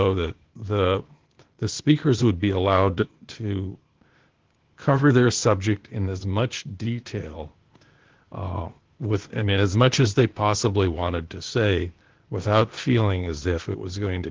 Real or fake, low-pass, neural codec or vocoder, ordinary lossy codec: fake; 7.2 kHz; codec, 16 kHz, 0.8 kbps, ZipCodec; Opus, 16 kbps